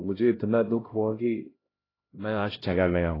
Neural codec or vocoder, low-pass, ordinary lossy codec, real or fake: codec, 16 kHz, 0.5 kbps, X-Codec, HuBERT features, trained on LibriSpeech; 5.4 kHz; AAC, 24 kbps; fake